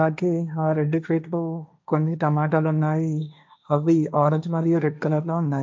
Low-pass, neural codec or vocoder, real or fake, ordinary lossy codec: none; codec, 16 kHz, 1.1 kbps, Voila-Tokenizer; fake; none